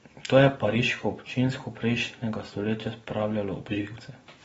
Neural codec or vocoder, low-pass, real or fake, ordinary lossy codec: none; 19.8 kHz; real; AAC, 24 kbps